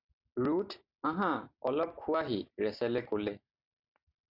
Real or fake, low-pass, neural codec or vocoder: real; 5.4 kHz; none